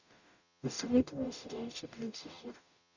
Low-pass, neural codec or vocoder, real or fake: 7.2 kHz; codec, 44.1 kHz, 0.9 kbps, DAC; fake